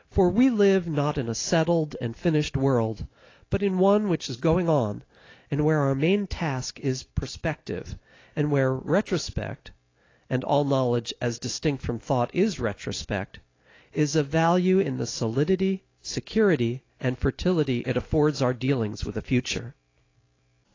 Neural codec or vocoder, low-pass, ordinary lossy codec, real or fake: none; 7.2 kHz; AAC, 32 kbps; real